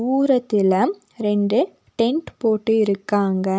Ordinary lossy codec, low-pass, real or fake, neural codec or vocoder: none; none; real; none